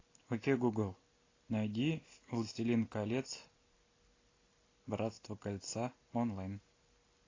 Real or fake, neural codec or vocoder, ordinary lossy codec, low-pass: real; none; AAC, 32 kbps; 7.2 kHz